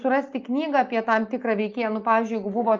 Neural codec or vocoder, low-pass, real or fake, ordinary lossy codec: none; 7.2 kHz; real; Opus, 24 kbps